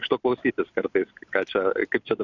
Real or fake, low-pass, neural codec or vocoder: real; 7.2 kHz; none